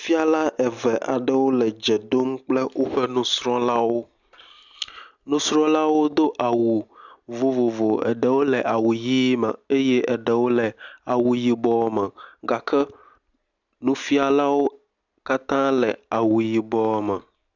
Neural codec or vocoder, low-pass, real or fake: none; 7.2 kHz; real